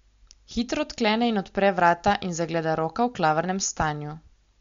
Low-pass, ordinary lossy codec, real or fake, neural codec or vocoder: 7.2 kHz; MP3, 48 kbps; real; none